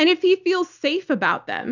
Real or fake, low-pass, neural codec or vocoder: real; 7.2 kHz; none